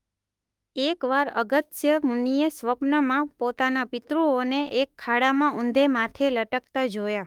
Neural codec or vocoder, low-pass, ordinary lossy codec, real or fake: autoencoder, 48 kHz, 32 numbers a frame, DAC-VAE, trained on Japanese speech; 19.8 kHz; Opus, 32 kbps; fake